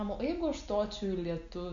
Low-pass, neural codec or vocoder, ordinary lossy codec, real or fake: 7.2 kHz; none; MP3, 96 kbps; real